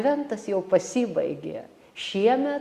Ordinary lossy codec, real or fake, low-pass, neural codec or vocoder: Opus, 64 kbps; real; 14.4 kHz; none